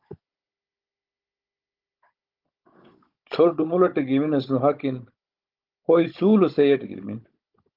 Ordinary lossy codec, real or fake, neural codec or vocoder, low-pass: Opus, 32 kbps; fake; codec, 16 kHz, 16 kbps, FunCodec, trained on Chinese and English, 50 frames a second; 5.4 kHz